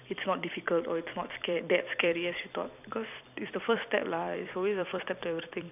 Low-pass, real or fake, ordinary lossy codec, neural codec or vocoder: 3.6 kHz; fake; none; vocoder, 44.1 kHz, 128 mel bands every 256 samples, BigVGAN v2